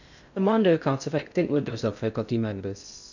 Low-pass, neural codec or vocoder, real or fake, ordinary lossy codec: 7.2 kHz; codec, 16 kHz in and 24 kHz out, 0.6 kbps, FocalCodec, streaming, 4096 codes; fake; none